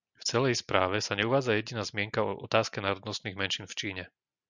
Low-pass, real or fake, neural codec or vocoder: 7.2 kHz; real; none